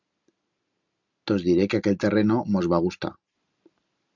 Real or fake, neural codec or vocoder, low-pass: real; none; 7.2 kHz